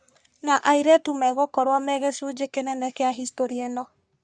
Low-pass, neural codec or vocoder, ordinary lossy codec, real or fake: 9.9 kHz; codec, 44.1 kHz, 3.4 kbps, Pupu-Codec; Opus, 64 kbps; fake